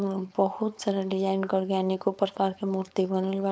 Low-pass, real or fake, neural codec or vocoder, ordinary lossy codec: none; fake; codec, 16 kHz, 4.8 kbps, FACodec; none